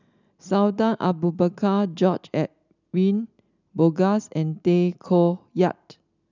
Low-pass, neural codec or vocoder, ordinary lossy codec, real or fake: 7.2 kHz; none; none; real